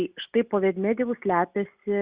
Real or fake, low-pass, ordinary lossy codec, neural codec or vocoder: real; 3.6 kHz; Opus, 64 kbps; none